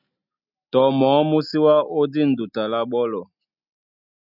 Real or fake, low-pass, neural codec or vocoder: real; 5.4 kHz; none